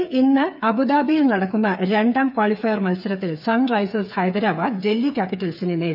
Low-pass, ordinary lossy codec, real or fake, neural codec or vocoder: 5.4 kHz; none; fake; codec, 16 kHz, 8 kbps, FreqCodec, smaller model